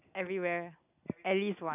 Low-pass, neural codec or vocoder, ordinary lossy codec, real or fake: 3.6 kHz; none; none; real